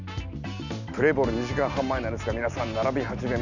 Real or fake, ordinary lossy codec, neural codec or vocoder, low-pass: real; none; none; 7.2 kHz